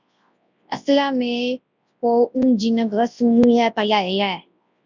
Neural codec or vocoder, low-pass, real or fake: codec, 24 kHz, 0.9 kbps, WavTokenizer, large speech release; 7.2 kHz; fake